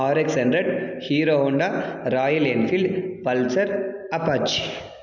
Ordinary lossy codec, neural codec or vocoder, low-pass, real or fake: none; none; 7.2 kHz; real